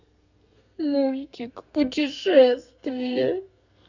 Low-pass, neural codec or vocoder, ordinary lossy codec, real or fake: 7.2 kHz; codec, 32 kHz, 1.9 kbps, SNAC; none; fake